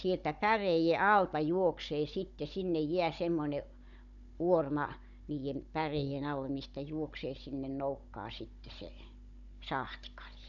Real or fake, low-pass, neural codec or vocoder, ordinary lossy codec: fake; 7.2 kHz; codec, 16 kHz, 16 kbps, FunCodec, trained on Chinese and English, 50 frames a second; none